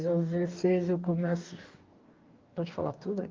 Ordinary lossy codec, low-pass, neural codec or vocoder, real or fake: Opus, 32 kbps; 7.2 kHz; codec, 44.1 kHz, 2.6 kbps, DAC; fake